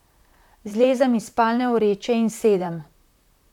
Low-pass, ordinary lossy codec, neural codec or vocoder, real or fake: 19.8 kHz; none; vocoder, 44.1 kHz, 128 mel bands, Pupu-Vocoder; fake